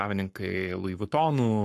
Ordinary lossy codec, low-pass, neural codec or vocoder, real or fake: AAC, 64 kbps; 14.4 kHz; codec, 44.1 kHz, 7.8 kbps, Pupu-Codec; fake